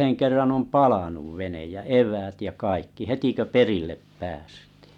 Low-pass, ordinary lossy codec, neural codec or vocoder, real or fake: 19.8 kHz; none; none; real